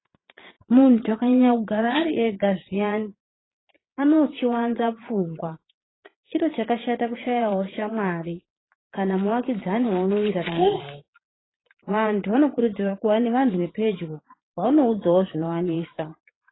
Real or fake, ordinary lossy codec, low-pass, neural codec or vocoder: fake; AAC, 16 kbps; 7.2 kHz; vocoder, 22.05 kHz, 80 mel bands, Vocos